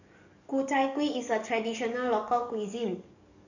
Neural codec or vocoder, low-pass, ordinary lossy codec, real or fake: codec, 44.1 kHz, 7.8 kbps, DAC; 7.2 kHz; none; fake